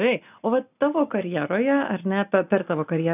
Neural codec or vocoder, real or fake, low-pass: none; real; 3.6 kHz